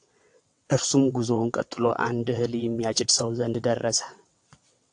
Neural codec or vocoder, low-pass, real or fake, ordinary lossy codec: vocoder, 22.05 kHz, 80 mel bands, WaveNeXt; 9.9 kHz; fake; AAC, 64 kbps